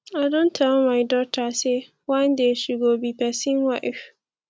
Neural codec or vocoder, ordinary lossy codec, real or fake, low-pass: none; none; real; none